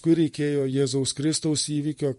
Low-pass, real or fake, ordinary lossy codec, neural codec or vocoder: 14.4 kHz; fake; MP3, 48 kbps; vocoder, 44.1 kHz, 128 mel bands every 512 samples, BigVGAN v2